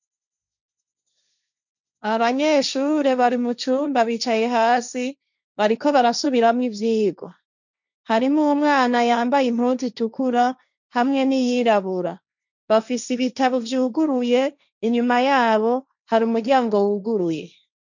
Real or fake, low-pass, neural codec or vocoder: fake; 7.2 kHz; codec, 16 kHz, 1.1 kbps, Voila-Tokenizer